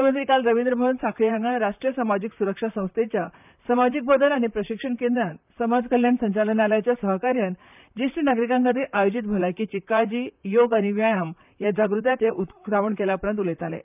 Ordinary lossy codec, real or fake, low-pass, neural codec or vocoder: none; fake; 3.6 kHz; vocoder, 44.1 kHz, 128 mel bands, Pupu-Vocoder